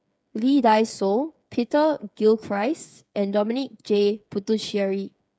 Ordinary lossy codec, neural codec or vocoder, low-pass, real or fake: none; codec, 16 kHz, 8 kbps, FreqCodec, smaller model; none; fake